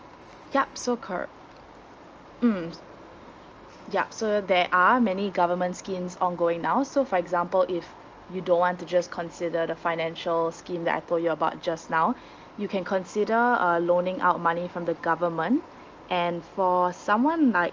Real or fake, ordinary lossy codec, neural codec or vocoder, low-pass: real; Opus, 24 kbps; none; 7.2 kHz